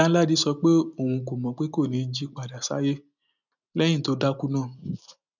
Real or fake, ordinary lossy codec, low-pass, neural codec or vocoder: real; none; 7.2 kHz; none